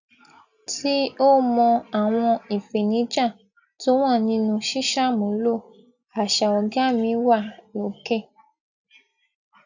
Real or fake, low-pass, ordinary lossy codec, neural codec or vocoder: real; 7.2 kHz; none; none